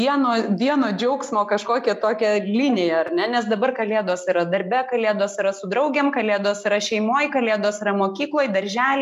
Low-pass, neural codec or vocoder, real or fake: 14.4 kHz; none; real